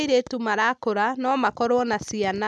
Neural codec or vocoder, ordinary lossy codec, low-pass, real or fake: none; none; none; real